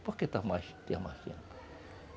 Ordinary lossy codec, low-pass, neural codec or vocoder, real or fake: none; none; none; real